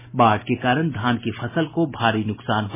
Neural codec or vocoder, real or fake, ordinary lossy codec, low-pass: none; real; MP3, 16 kbps; 3.6 kHz